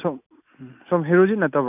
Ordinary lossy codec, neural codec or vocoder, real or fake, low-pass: none; none; real; 3.6 kHz